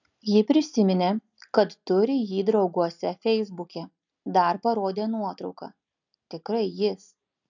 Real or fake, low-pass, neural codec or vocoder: real; 7.2 kHz; none